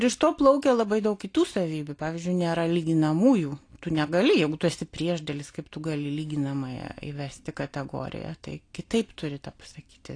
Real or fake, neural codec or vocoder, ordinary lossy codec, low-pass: real; none; AAC, 48 kbps; 9.9 kHz